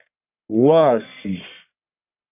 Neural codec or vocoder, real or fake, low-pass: codec, 44.1 kHz, 1.7 kbps, Pupu-Codec; fake; 3.6 kHz